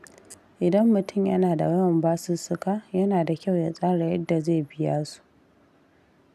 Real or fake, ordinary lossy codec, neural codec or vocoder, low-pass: real; none; none; 14.4 kHz